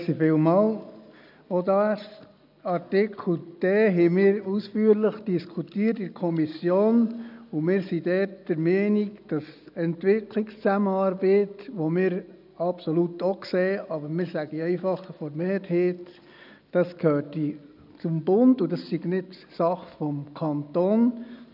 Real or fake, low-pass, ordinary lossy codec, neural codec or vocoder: real; 5.4 kHz; none; none